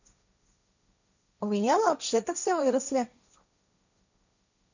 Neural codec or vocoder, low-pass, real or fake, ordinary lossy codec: codec, 16 kHz, 1.1 kbps, Voila-Tokenizer; 7.2 kHz; fake; none